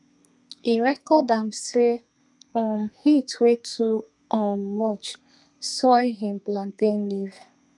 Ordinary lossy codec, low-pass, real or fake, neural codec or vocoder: none; 10.8 kHz; fake; codec, 32 kHz, 1.9 kbps, SNAC